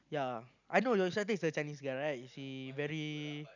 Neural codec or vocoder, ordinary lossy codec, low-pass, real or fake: none; none; 7.2 kHz; real